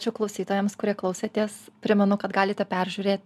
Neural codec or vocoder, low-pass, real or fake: none; 14.4 kHz; real